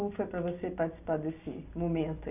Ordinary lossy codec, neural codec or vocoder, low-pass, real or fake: none; none; 3.6 kHz; real